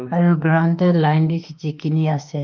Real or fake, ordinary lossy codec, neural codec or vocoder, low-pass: fake; Opus, 32 kbps; autoencoder, 48 kHz, 32 numbers a frame, DAC-VAE, trained on Japanese speech; 7.2 kHz